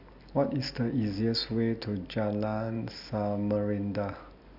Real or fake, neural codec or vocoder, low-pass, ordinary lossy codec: real; none; 5.4 kHz; none